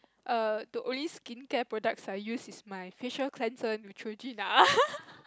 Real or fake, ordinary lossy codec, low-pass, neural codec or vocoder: real; none; none; none